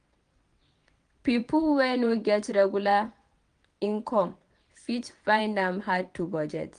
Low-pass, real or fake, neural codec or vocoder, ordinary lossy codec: 9.9 kHz; fake; vocoder, 22.05 kHz, 80 mel bands, WaveNeXt; Opus, 32 kbps